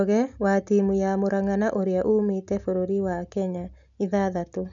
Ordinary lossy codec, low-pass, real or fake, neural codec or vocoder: none; 7.2 kHz; real; none